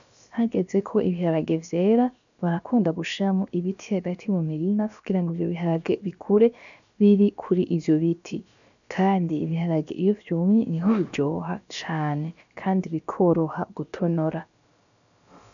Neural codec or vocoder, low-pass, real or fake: codec, 16 kHz, about 1 kbps, DyCAST, with the encoder's durations; 7.2 kHz; fake